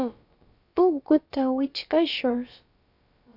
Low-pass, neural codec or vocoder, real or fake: 5.4 kHz; codec, 16 kHz, about 1 kbps, DyCAST, with the encoder's durations; fake